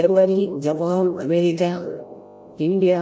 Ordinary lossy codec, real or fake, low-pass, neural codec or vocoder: none; fake; none; codec, 16 kHz, 0.5 kbps, FreqCodec, larger model